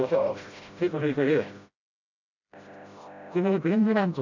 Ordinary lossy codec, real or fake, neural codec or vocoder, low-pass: none; fake; codec, 16 kHz, 0.5 kbps, FreqCodec, smaller model; 7.2 kHz